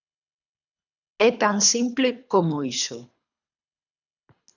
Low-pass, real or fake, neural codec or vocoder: 7.2 kHz; fake; codec, 24 kHz, 3 kbps, HILCodec